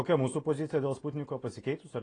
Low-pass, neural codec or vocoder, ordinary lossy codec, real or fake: 9.9 kHz; none; AAC, 32 kbps; real